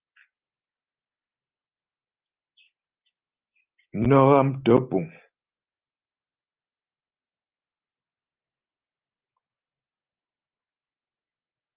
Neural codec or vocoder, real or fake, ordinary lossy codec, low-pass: none; real; Opus, 24 kbps; 3.6 kHz